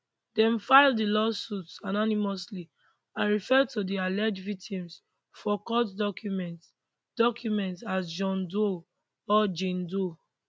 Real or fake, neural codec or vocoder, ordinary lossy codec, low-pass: real; none; none; none